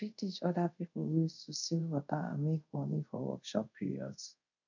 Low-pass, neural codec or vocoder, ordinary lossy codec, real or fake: 7.2 kHz; codec, 24 kHz, 0.5 kbps, DualCodec; none; fake